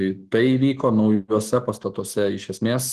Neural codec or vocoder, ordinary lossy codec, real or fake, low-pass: autoencoder, 48 kHz, 128 numbers a frame, DAC-VAE, trained on Japanese speech; Opus, 16 kbps; fake; 14.4 kHz